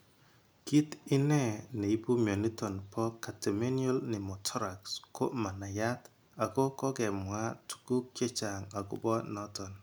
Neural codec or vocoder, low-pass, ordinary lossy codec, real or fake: none; none; none; real